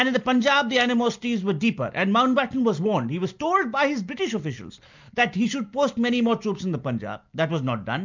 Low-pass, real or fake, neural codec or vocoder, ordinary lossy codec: 7.2 kHz; real; none; MP3, 64 kbps